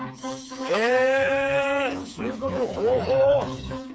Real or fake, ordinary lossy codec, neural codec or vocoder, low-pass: fake; none; codec, 16 kHz, 4 kbps, FreqCodec, smaller model; none